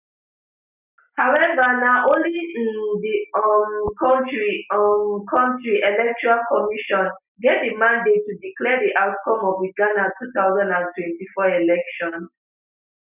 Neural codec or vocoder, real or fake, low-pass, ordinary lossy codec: none; real; 3.6 kHz; none